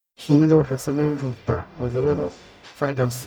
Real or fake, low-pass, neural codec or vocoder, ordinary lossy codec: fake; none; codec, 44.1 kHz, 0.9 kbps, DAC; none